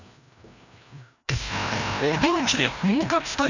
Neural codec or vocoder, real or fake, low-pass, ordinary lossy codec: codec, 16 kHz, 1 kbps, FreqCodec, larger model; fake; 7.2 kHz; none